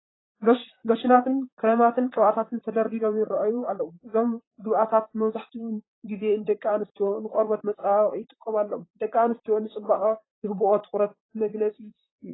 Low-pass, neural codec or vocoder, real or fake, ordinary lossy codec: 7.2 kHz; vocoder, 44.1 kHz, 128 mel bands, Pupu-Vocoder; fake; AAC, 16 kbps